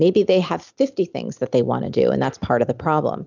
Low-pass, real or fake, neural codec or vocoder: 7.2 kHz; real; none